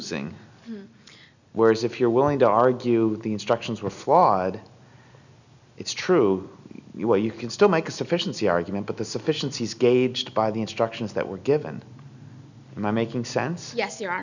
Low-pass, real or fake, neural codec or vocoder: 7.2 kHz; real; none